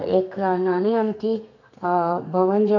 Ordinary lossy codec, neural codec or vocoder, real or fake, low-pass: none; codec, 32 kHz, 1.9 kbps, SNAC; fake; 7.2 kHz